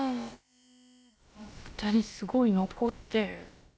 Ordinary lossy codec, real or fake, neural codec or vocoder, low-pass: none; fake; codec, 16 kHz, about 1 kbps, DyCAST, with the encoder's durations; none